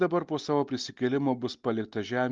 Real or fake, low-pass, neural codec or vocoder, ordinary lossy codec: real; 7.2 kHz; none; Opus, 32 kbps